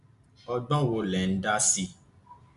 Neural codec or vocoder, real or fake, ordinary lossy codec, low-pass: none; real; AAC, 96 kbps; 10.8 kHz